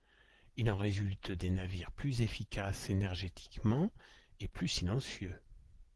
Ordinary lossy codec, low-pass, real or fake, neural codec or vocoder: Opus, 16 kbps; 10.8 kHz; fake; vocoder, 24 kHz, 100 mel bands, Vocos